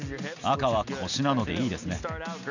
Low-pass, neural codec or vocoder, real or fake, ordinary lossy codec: 7.2 kHz; none; real; none